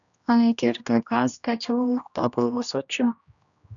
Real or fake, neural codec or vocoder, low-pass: fake; codec, 16 kHz, 1 kbps, X-Codec, HuBERT features, trained on general audio; 7.2 kHz